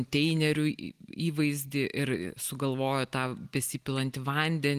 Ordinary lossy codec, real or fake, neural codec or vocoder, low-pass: Opus, 24 kbps; real; none; 14.4 kHz